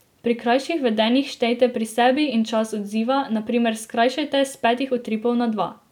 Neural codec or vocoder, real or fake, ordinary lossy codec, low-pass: none; real; none; 19.8 kHz